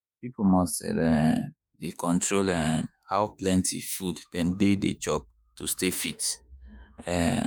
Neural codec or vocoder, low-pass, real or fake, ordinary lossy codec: autoencoder, 48 kHz, 32 numbers a frame, DAC-VAE, trained on Japanese speech; none; fake; none